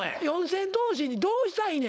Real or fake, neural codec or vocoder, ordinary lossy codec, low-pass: fake; codec, 16 kHz, 4.8 kbps, FACodec; none; none